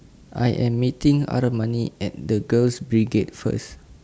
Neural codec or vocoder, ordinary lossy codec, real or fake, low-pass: none; none; real; none